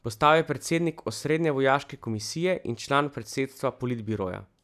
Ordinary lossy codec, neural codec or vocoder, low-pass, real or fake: none; none; 14.4 kHz; real